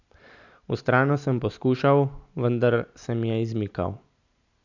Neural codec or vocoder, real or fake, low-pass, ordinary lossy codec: none; real; 7.2 kHz; none